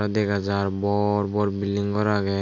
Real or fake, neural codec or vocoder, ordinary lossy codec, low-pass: real; none; AAC, 48 kbps; 7.2 kHz